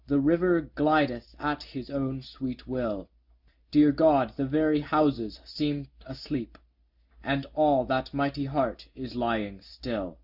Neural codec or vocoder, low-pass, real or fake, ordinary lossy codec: none; 5.4 kHz; real; Opus, 64 kbps